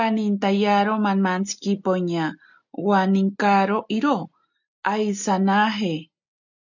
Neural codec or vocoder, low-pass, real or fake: none; 7.2 kHz; real